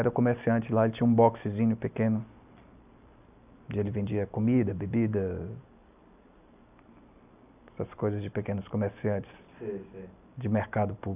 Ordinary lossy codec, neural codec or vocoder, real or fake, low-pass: none; none; real; 3.6 kHz